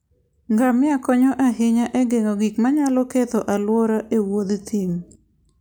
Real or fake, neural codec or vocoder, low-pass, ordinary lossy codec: real; none; none; none